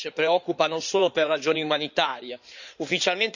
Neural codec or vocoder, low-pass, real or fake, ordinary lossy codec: codec, 16 kHz in and 24 kHz out, 2.2 kbps, FireRedTTS-2 codec; 7.2 kHz; fake; none